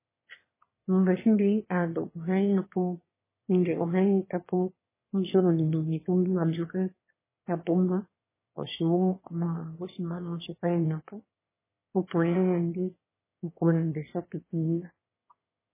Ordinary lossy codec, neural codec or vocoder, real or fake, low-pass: MP3, 16 kbps; autoencoder, 22.05 kHz, a latent of 192 numbers a frame, VITS, trained on one speaker; fake; 3.6 kHz